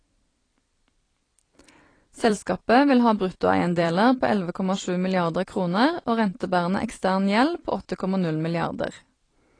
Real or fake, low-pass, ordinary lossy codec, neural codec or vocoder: fake; 9.9 kHz; AAC, 32 kbps; vocoder, 44.1 kHz, 128 mel bands every 256 samples, BigVGAN v2